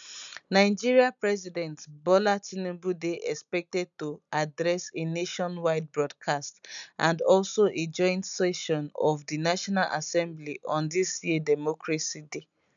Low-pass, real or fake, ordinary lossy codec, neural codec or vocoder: 7.2 kHz; real; none; none